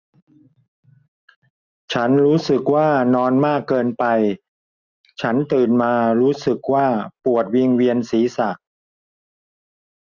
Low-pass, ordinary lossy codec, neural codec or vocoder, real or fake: 7.2 kHz; none; none; real